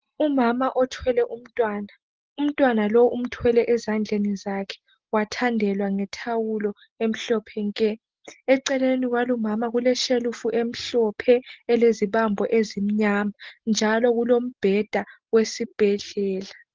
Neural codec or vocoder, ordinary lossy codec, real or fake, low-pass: none; Opus, 16 kbps; real; 7.2 kHz